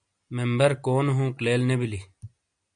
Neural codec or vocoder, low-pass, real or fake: none; 9.9 kHz; real